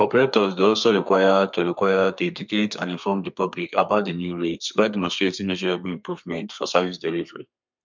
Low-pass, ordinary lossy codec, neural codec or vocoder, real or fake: 7.2 kHz; MP3, 64 kbps; codec, 32 kHz, 1.9 kbps, SNAC; fake